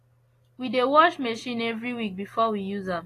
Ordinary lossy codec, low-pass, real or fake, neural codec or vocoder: AAC, 48 kbps; 14.4 kHz; real; none